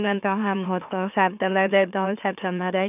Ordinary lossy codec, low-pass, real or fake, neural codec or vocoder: none; 3.6 kHz; fake; autoencoder, 44.1 kHz, a latent of 192 numbers a frame, MeloTTS